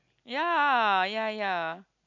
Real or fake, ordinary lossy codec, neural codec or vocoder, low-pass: real; none; none; 7.2 kHz